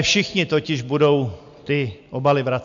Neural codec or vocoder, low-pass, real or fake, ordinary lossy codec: none; 7.2 kHz; real; MP3, 64 kbps